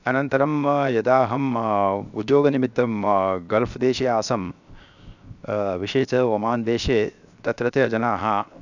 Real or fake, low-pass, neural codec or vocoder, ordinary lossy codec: fake; 7.2 kHz; codec, 16 kHz, 0.7 kbps, FocalCodec; none